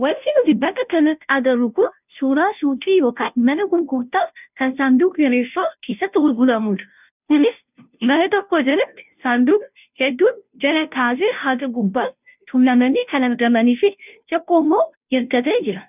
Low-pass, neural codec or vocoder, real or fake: 3.6 kHz; codec, 16 kHz, 0.5 kbps, FunCodec, trained on Chinese and English, 25 frames a second; fake